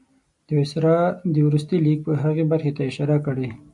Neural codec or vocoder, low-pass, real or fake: none; 10.8 kHz; real